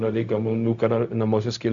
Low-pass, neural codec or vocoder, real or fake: 7.2 kHz; codec, 16 kHz, 0.4 kbps, LongCat-Audio-Codec; fake